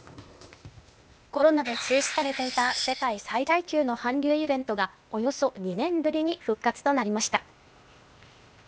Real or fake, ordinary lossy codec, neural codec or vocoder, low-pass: fake; none; codec, 16 kHz, 0.8 kbps, ZipCodec; none